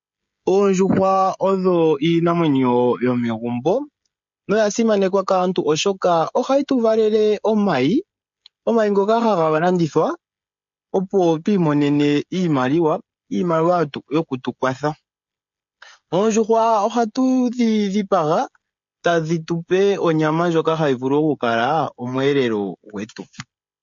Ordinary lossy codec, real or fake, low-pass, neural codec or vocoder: MP3, 48 kbps; fake; 7.2 kHz; codec, 16 kHz, 16 kbps, FreqCodec, smaller model